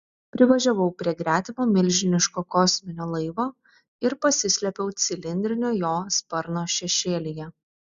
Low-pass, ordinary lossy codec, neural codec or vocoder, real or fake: 7.2 kHz; Opus, 64 kbps; none; real